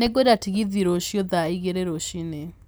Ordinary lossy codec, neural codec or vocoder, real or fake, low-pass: none; none; real; none